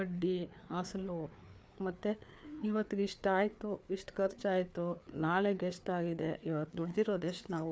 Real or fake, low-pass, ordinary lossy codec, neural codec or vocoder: fake; none; none; codec, 16 kHz, 4 kbps, FreqCodec, larger model